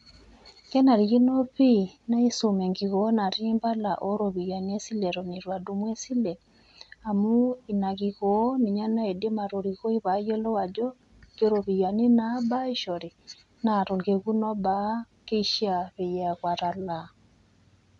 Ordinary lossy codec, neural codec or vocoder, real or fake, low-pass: none; none; real; 10.8 kHz